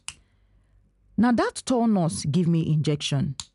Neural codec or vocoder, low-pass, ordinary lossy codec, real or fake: none; 10.8 kHz; none; real